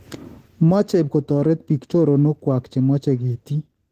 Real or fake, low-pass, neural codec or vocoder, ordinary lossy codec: real; 14.4 kHz; none; Opus, 16 kbps